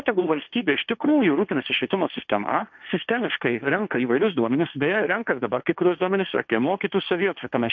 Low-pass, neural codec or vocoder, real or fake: 7.2 kHz; codec, 16 kHz, 1.1 kbps, Voila-Tokenizer; fake